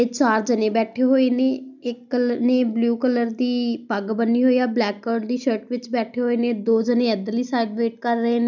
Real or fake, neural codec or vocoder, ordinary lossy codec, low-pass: real; none; none; 7.2 kHz